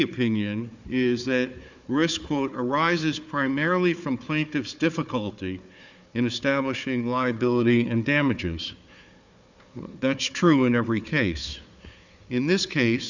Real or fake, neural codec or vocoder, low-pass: fake; codec, 16 kHz, 4 kbps, FunCodec, trained on Chinese and English, 50 frames a second; 7.2 kHz